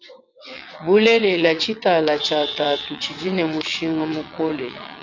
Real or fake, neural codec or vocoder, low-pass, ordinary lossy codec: fake; vocoder, 22.05 kHz, 80 mel bands, WaveNeXt; 7.2 kHz; MP3, 64 kbps